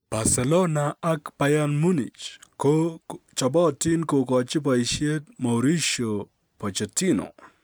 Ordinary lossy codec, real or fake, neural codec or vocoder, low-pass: none; real; none; none